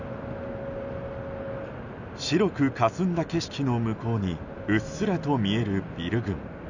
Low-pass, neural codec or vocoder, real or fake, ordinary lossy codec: 7.2 kHz; none; real; none